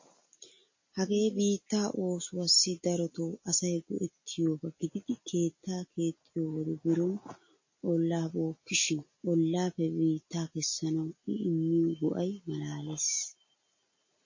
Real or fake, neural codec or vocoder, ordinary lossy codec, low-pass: real; none; MP3, 32 kbps; 7.2 kHz